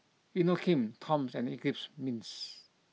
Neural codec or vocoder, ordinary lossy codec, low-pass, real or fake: none; none; none; real